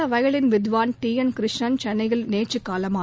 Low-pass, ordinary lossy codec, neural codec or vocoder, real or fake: none; none; none; real